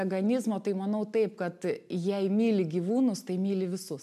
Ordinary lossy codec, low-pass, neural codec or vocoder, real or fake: MP3, 96 kbps; 14.4 kHz; none; real